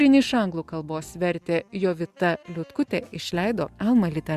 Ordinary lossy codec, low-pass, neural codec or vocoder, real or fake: Opus, 64 kbps; 14.4 kHz; none; real